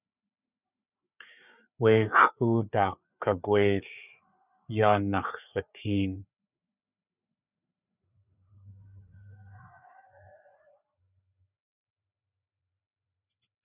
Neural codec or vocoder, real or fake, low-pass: codec, 16 kHz, 4 kbps, FreqCodec, larger model; fake; 3.6 kHz